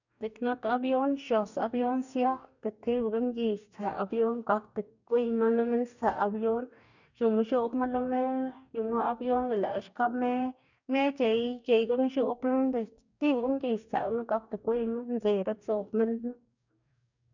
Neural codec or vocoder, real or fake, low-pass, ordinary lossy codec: codec, 44.1 kHz, 2.6 kbps, DAC; fake; 7.2 kHz; none